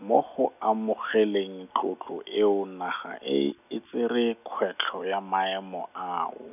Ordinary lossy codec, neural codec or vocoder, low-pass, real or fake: none; none; 3.6 kHz; real